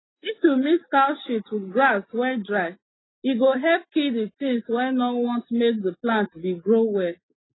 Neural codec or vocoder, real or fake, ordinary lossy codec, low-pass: none; real; AAC, 16 kbps; 7.2 kHz